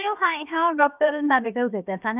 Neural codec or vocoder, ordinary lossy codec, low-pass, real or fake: codec, 16 kHz, 0.7 kbps, FocalCodec; none; 3.6 kHz; fake